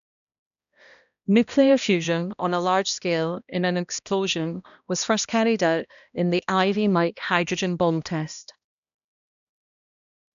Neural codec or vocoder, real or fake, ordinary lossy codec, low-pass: codec, 16 kHz, 1 kbps, X-Codec, HuBERT features, trained on balanced general audio; fake; none; 7.2 kHz